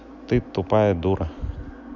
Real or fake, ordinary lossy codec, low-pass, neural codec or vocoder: real; none; 7.2 kHz; none